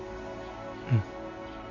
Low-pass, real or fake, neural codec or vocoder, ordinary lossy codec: 7.2 kHz; real; none; none